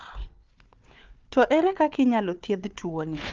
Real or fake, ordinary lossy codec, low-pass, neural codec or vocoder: fake; Opus, 24 kbps; 7.2 kHz; codec, 16 kHz, 4 kbps, FunCodec, trained on Chinese and English, 50 frames a second